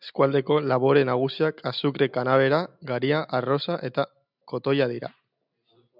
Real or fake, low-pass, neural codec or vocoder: real; 5.4 kHz; none